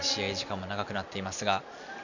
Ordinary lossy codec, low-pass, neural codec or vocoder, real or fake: none; 7.2 kHz; none; real